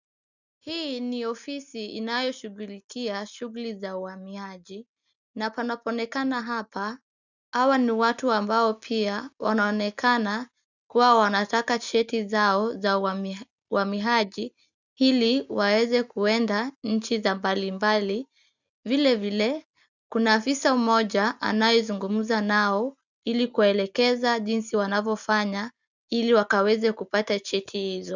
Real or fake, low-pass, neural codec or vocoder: real; 7.2 kHz; none